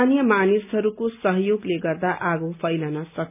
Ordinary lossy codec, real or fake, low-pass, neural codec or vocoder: none; real; 3.6 kHz; none